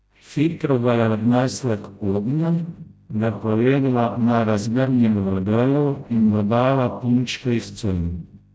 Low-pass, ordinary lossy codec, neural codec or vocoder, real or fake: none; none; codec, 16 kHz, 0.5 kbps, FreqCodec, smaller model; fake